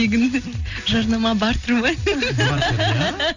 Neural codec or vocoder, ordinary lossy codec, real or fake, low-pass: none; none; real; 7.2 kHz